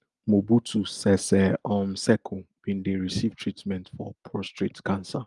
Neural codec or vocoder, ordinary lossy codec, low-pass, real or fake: none; Opus, 32 kbps; 10.8 kHz; real